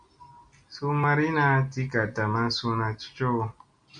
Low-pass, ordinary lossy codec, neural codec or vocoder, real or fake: 9.9 kHz; MP3, 96 kbps; none; real